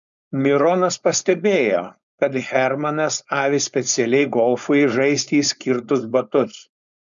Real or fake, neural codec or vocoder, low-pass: fake; codec, 16 kHz, 4.8 kbps, FACodec; 7.2 kHz